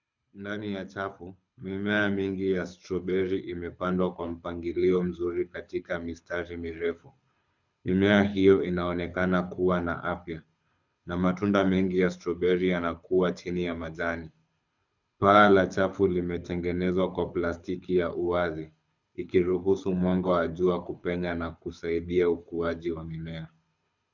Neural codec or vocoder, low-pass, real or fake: codec, 24 kHz, 6 kbps, HILCodec; 7.2 kHz; fake